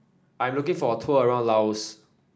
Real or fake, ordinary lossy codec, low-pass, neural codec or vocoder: real; none; none; none